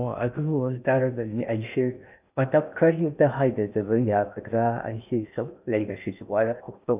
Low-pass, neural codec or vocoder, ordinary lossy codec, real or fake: 3.6 kHz; codec, 16 kHz in and 24 kHz out, 0.6 kbps, FocalCodec, streaming, 2048 codes; none; fake